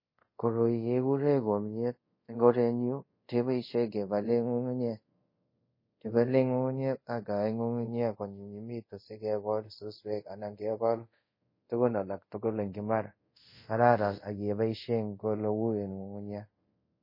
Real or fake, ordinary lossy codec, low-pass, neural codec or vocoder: fake; MP3, 24 kbps; 5.4 kHz; codec, 24 kHz, 0.5 kbps, DualCodec